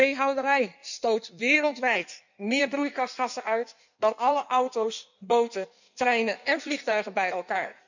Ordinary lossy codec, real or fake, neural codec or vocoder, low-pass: none; fake; codec, 16 kHz in and 24 kHz out, 1.1 kbps, FireRedTTS-2 codec; 7.2 kHz